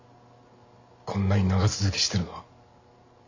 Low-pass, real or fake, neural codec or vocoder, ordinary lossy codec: 7.2 kHz; real; none; AAC, 48 kbps